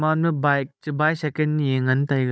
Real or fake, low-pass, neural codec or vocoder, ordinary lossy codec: fake; none; codec, 16 kHz, 16 kbps, FunCodec, trained on Chinese and English, 50 frames a second; none